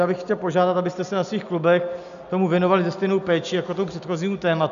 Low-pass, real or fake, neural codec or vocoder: 7.2 kHz; real; none